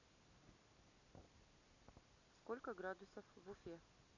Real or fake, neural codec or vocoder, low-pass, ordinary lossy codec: real; none; 7.2 kHz; none